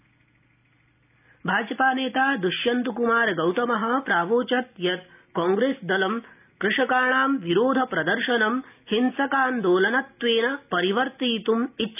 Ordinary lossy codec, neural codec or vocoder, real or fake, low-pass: none; none; real; 3.6 kHz